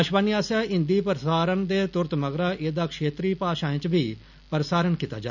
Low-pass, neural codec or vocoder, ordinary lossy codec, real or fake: 7.2 kHz; none; none; real